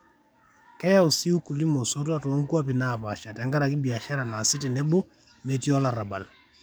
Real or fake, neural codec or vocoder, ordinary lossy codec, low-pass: fake; codec, 44.1 kHz, 7.8 kbps, DAC; none; none